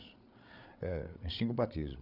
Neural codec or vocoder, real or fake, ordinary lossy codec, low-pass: codec, 16 kHz, 8 kbps, FreqCodec, larger model; fake; none; 5.4 kHz